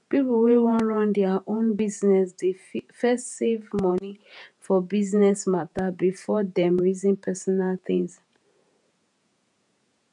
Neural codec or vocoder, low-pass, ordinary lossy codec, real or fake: vocoder, 48 kHz, 128 mel bands, Vocos; 10.8 kHz; none; fake